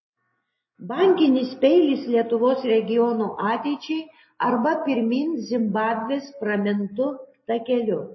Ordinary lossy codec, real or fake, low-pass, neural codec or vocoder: MP3, 24 kbps; real; 7.2 kHz; none